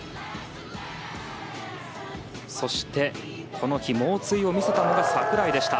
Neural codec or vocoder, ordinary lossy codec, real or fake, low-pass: none; none; real; none